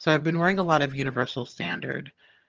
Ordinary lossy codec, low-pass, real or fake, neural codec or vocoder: Opus, 24 kbps; 7.2 kHz; fake; vocoder, 22.05 kHz, 80 mel bands, HiFi-GAN